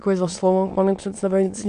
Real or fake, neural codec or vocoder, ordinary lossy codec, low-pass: fake; autoencoder, 22.05 kHz, a latent of 192 numbers a frame, VITS, trained on many speakers; AAC, 64 kbps; 9.9 kHz